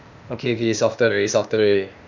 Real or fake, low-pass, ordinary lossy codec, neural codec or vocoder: fake; 7.2 kHz; none; codec, 16 kHz, 0.8 kbps, ZipCodec